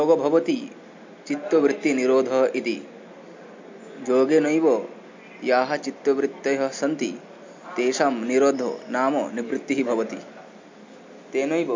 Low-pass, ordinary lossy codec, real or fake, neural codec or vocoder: 7.2 kHz; MP3, 48 kbps; real; none